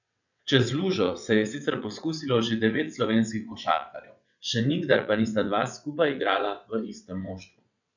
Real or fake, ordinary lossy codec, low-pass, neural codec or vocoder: fake; none; 7.2 kHz; vocoder, 22.05 kHz, 80 mel bands, WaveNeXt